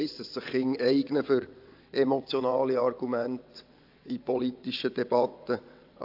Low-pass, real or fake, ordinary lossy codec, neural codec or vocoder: 5.4 kHz; fake; AAC, 48 kbps; vocoder, 22.05 kHz, 80 mel bands, WaveNeXt